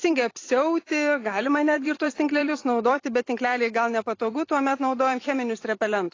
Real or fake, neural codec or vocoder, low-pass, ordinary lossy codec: fake; vocoder, 44.1 kHz, 128 mel bands every 256 samples, BigVGAN v2; 7.2 kHz; AAC, 32 kbps